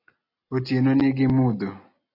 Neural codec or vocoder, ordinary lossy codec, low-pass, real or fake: none; AAC, 24 kbps; 5.4 kHz; real